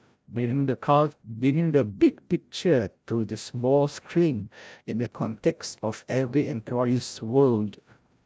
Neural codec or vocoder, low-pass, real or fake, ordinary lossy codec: codec, 16 kHz, 0.5 kbps, FreqCodec, larger model; none; fake; none